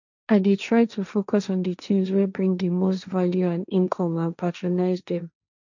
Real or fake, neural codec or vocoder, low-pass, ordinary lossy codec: fake; codec, 16 kHz, 1.1 kbps, Voila-Tokenizer; 7.2 kHz; none